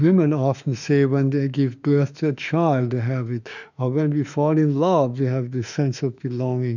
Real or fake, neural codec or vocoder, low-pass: fake; autoencoder, 48 kHz, 32 numbers a frame, DAC-VAE, trained on Japanese speech; 7.2 kHz